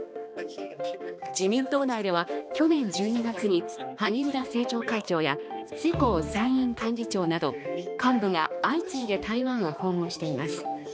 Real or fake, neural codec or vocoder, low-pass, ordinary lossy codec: fake; codec, 16 kHz, 2 kbps, X-Codec, HuBERT features, trained on balanced general audio; none; none